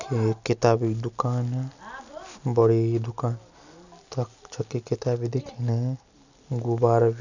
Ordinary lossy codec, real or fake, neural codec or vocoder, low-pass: none; real; none; 7.2 kHz